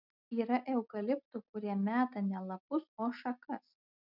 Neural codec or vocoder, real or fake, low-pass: none; real; 5.4 kHz